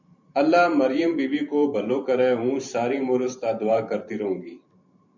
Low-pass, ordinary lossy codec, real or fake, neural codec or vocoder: 7.2 kHz; MP3, 64 kbps; fake; vocoder, 44.1 kHz, 128 mel bands every 512 samples, BigVGAN v2